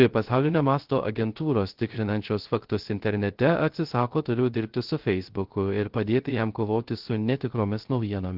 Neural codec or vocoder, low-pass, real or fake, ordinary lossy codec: codec, 16 kHz, 0.3 kbps, FocalCodec; 5.4 kHz; fake; Opus, 16 kbps